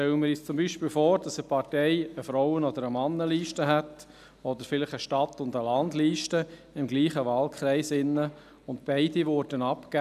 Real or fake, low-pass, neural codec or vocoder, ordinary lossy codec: real; 14.4 kHz; none; none